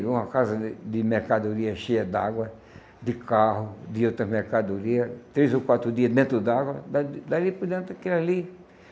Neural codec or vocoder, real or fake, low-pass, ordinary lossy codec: none; real; none; none